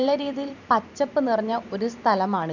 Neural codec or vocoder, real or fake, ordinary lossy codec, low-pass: vocoder, 44.1 kHz, 128 mel bands every 512 samples, BigVGAN v2; fake; none; 7.2 kHz